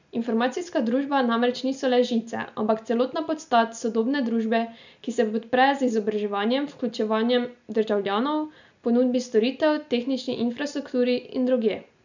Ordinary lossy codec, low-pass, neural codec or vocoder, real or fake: none; 7.2 kHz; none; real